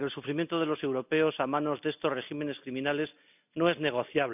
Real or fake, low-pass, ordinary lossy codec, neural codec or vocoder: real; 3.6 kHz; none; none